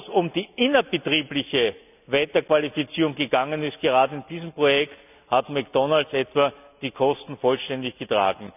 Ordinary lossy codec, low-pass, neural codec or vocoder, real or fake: none; 3.6 kHz; none; real